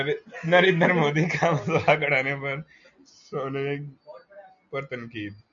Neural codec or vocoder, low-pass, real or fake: none; 7.2 kHz; real